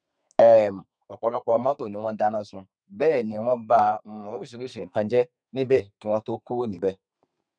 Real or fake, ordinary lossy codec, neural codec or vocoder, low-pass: fake; none; codec, 32 kHz, 1.9 kbps, SNAC; 9.9 kHz